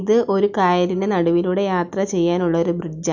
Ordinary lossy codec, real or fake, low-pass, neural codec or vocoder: none; real; 7.2 kHz; none